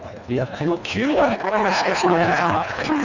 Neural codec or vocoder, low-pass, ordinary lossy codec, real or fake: codec, 24 kHz, 1.5 kbps, HILCodec; 7.2 kHz; none; fake